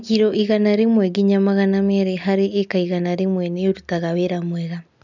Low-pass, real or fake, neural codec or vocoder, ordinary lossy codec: 7.2 kHz; real; none; none